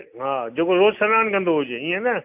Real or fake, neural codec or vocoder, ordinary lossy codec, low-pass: real; none; AAC, 32 kbps; 3.6 kHz